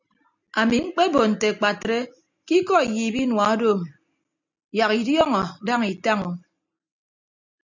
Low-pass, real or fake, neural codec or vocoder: 7.2 kHz; real; none